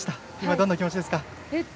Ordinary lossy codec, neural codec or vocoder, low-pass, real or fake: none; none; none; real